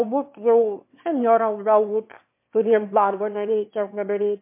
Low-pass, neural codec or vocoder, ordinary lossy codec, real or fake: 3.6 kHz; autoencoder, 22.05 kHz, a latent of 192 numbers a frame, VITS, trained on one speaker; MP3, 24 kbps; fake